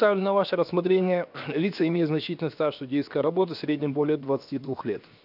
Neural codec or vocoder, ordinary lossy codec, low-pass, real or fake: codec, 16 kHz, 0.7 kbps, FocalCodec; none; 5.4 kHz; fake